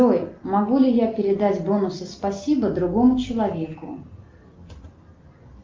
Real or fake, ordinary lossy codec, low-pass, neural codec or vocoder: real; Opus, 24 kbps; 7.2 kHz; none